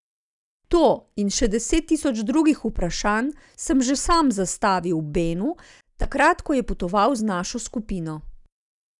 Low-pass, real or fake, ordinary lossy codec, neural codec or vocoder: 10.8 kHz; real; none; none